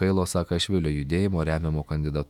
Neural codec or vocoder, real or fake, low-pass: autoencoder, 48 kHz, 128 numbers a frame, DAC-VAE, trained on Japanese speech; fake; 19.8 kHz